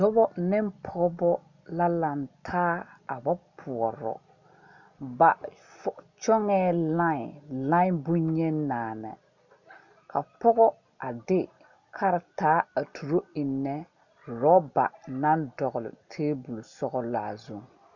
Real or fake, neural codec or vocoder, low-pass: real; none; 7.2 kHz